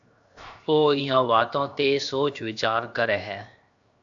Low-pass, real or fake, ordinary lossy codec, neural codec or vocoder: 7.2 kHz; fake; AAC, 64 kbps; codec, 16 kHz, 0.7 kbps, FocalCodec